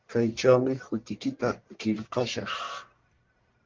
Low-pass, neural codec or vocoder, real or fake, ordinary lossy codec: 7.2 kHz; codec, 44.1 kHz, 1.7 kbps, Pupu-Codec; fake; Opus, 32 kbps